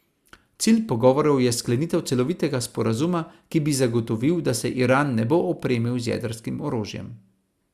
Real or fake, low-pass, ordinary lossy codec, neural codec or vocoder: real; 14.4 kHz; Opus, 64 kbps; none